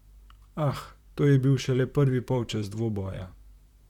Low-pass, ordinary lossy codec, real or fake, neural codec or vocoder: 19.8 kHz; none; fake; vocoder, 44.1 kHz, 128 mel bands, Pupu-Vocoder